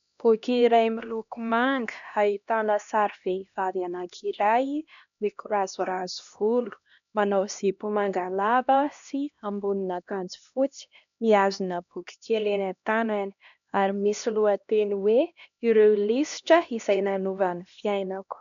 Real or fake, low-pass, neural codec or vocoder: fake; 7.2 kHz; codec, 16 kHz, 1 kbps, X-Codec, HuBERT features, trained on LibriSpeech